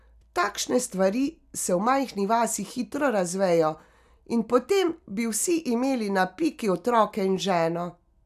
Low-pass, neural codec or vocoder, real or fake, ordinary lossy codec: 14.4 kHz; none; real; none